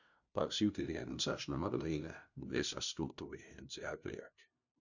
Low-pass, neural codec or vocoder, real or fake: 7.2 kHz; codec, 16 kHz, 0.5 kbps, FunCodec, trained on LibriTTS, 25 frames a second; fake